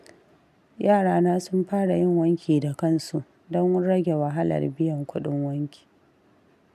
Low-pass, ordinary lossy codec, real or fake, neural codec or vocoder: 14.4 kHz; none; real; none